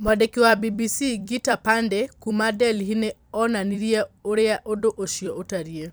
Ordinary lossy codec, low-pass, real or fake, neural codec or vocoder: none; none; fake; vocoder, 44.1 kHz, 128 mel bands every 256 samples, BigVGAN v2